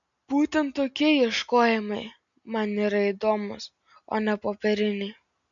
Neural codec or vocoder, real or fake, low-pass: none; real; 7.2 kHz